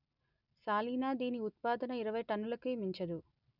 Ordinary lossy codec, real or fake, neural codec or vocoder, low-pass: none; real; none; 5.4 kHz